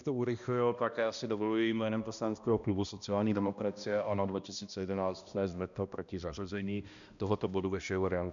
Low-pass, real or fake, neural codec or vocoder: 7.2 kHz; fake; codec, 16 kHz, 1 kbps, X-Codec, HuBERT features, trained on balanced general audio